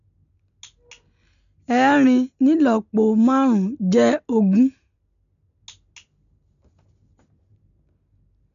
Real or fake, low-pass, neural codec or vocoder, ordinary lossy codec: real; 7.2 kHz; none; none